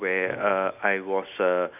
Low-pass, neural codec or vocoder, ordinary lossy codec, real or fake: 3.6 kHz; none; none; real